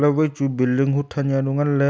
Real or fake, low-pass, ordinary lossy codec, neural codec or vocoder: real; none; none; none